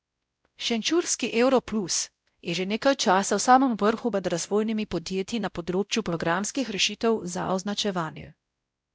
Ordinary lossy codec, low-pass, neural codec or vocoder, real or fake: none; none; codec, 16 kHz, 0.5 kbps, X-Codec, WavLM features, trained on Multilingual LibriSpeech; fake